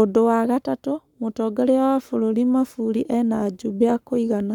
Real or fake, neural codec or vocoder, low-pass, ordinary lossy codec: fake; codec, 44.1 kHz, 7.8 kbps, Pupu-Codec; 19.8 kHz; none